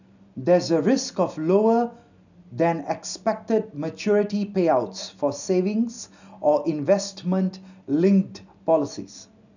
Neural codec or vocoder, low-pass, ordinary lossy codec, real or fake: none; 7.2 kHz; none; real